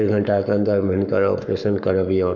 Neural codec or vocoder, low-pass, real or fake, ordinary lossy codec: codec, 44.1 kHz, 7.8 kbps, Pupu-Codec; 7.2 kHz; fake; none